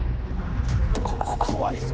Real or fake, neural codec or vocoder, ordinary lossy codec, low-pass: fake; codec, 16 kHz, 2 kbps, X-Codec, HuBERT features, trained on general audio; none; none